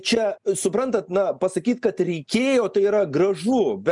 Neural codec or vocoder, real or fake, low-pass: none; real; 10.8 kHz